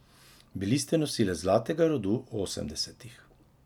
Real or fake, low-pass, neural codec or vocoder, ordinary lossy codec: real; 19.8 kHz; none; none